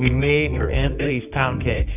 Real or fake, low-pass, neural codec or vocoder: fake; 3.6 kHz; codec, 24 kHz, 0.9 kbps, WavTokenizer, medium music audio release